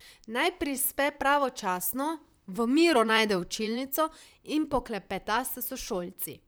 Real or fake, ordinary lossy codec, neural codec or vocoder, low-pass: fake; none; vocoder, 44.1 kHz, 128 mel bands, Pupu-Vocoder; none